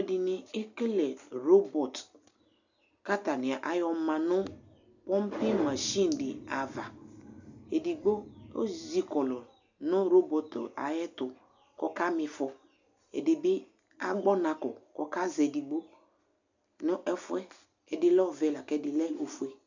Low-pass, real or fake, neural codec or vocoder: 7.2 kHz; real; none